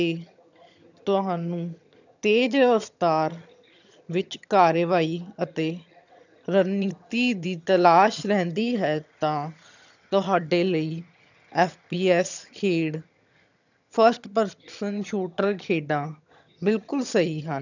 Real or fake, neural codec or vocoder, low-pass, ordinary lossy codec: fake; vocoder, 22.05 kHz, 80 mel bands, HiFi-GAN; 7.2 kHz; none